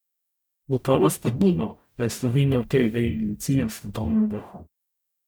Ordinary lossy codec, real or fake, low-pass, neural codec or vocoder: none; fake; none; codec, 44.1 kHz, 0.9 kbps, DAC